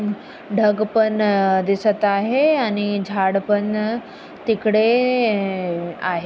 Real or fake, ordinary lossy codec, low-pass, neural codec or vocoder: real; none; none; none